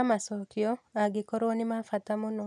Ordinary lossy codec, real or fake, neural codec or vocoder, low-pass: none; real; none; none